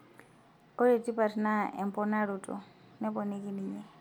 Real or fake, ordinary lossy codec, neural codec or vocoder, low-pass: real; MP3, 96 kbps; none; 19.8 kHz